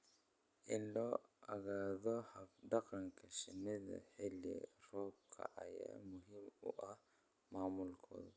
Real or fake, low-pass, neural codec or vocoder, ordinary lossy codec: real; none; none; none